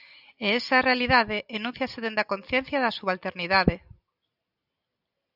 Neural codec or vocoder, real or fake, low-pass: none; real; 5.4 kHz